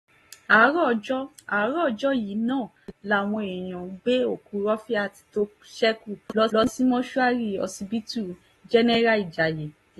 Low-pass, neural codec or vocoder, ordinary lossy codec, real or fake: 19.8 kHz; none; AAC, 32 kbps; real